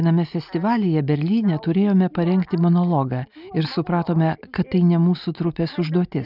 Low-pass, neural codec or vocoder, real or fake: 5.4 kHz; none; real